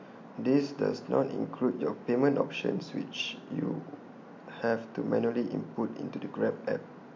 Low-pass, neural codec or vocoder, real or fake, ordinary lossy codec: 7.2 kHz; none; real; MP3, 48 kbps